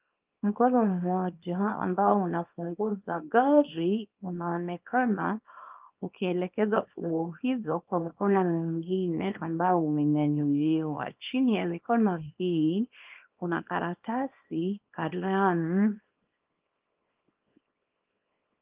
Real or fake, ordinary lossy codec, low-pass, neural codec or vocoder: fake; Opus, 24 kbps; 3.6 kHz; codec, 24 kHz, 0.9 kbps, WavTokenizer, small release